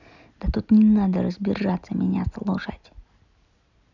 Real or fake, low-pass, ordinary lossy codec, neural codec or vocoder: real; 7.2 kHz; none; none